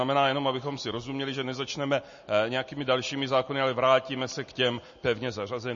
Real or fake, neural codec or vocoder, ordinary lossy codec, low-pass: real; none; MP3, 32 kbps; 7.2 kHz